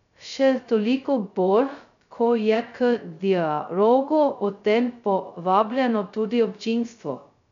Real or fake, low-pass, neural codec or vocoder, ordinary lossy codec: fake; 7.2 kHz; codec, 16 kHz, 0.2 kbps, FocalCodec; MP3, 96 kbps